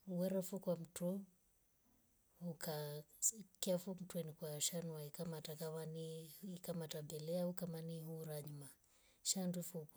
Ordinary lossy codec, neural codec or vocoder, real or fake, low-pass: none; none; real; none